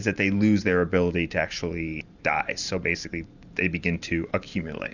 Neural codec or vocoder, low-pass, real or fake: vocoder, 44.1 kHz, 128 mel bands every 512 samples, BigVGAN v2; 7.2 kHz; fake